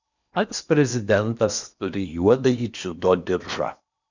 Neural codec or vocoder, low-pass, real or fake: codec, 16 kHz in and 24 kHz out, 0.8 kbps, FocalCodec, streaming, 65536 codes; 7.2 kHz; fake